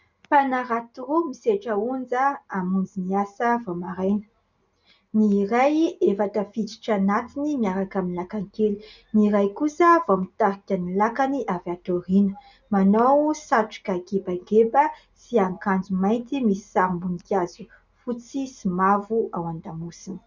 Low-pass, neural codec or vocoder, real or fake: 7.2 kHz; none; real